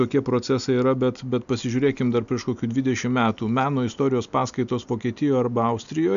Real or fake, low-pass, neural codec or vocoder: real; 7.2 kHz; none